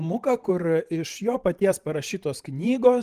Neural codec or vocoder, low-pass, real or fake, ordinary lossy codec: vocoder, 44.1 kHz, 128 mel bands every 512 samples, BigVGAN v2; 14.4 kHz; fake; Opus, 24 kbps